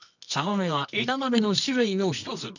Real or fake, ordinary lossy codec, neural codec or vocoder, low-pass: fake; none; codec, 24 kHz, 0.9 kbps, WavTokenizer, medium music audio release; 7.2 kHz